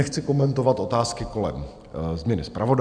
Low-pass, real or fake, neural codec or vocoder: 9.9 kHz; real; none